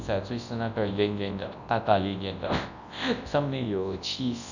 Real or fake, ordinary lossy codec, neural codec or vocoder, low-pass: fake; none; codec, 24 kHz, 0.9 kbps, WavTokenizer, large speech release; 7.2 kHz